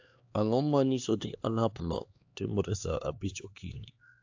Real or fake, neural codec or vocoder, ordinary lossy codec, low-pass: fake; codec, 16 kHz, 2 kbps, X-Codec, HuBERT features, trained on LibriSpeech; AAC, 48 kbps; 7.2 kHz